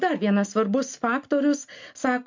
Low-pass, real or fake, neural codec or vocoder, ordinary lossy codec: 7.2 kHz; real; none; MP3, 48 kbps